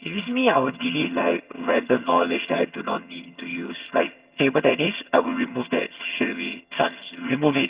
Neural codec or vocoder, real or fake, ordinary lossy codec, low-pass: vocoder, 22.05 kHz, 80 mel bands, HiFi-GAN; fake; Opus, 64 kbps; 3.6 kHz